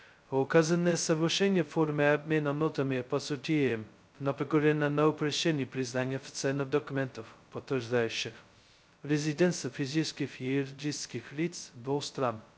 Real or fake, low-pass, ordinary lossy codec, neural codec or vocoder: fake; none; none; codec, 16 kHz, 0.2 kbps, FocalCodec